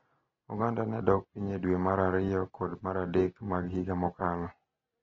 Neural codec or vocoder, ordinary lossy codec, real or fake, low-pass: none; AAC, 24 kbps; real; 7.2 kHz